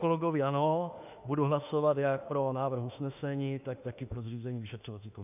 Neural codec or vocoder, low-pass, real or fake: autoencoder, 48 kHz, 32 numbers a frame, DAC-VAE, trained on Japanese speech; 3.6 kHz; fake